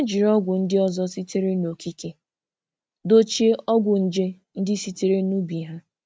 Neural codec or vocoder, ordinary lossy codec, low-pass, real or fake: none; none; none; real